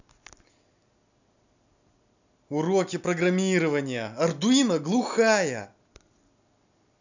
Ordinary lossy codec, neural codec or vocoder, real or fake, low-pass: none; none; real; 7.2 kHz